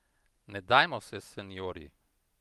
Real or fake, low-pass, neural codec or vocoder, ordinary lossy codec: real; 14.4 kHz; none; Opus, 32 kbps